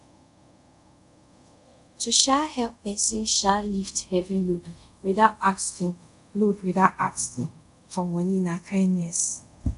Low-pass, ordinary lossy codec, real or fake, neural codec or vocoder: 10.8 kHz; none; fake; codec, 24 kHz, 0.5 kbps, DualCodec